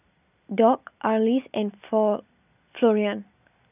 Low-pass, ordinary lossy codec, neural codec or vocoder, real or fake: 3.6 kHz; none; none; real